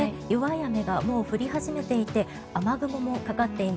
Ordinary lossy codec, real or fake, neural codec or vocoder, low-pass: none; real; none; none